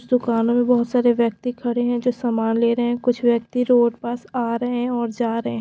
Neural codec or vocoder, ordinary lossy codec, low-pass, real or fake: none; none; none; real